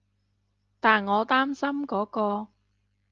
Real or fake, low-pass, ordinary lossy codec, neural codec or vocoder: real; 7.2 kHz; Opus, 32 kbps; none